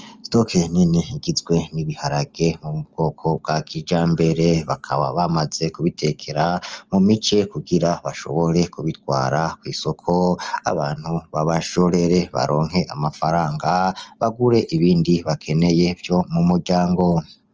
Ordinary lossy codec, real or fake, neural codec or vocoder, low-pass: Opus, 24 kbps; real; none; 7.2 kHz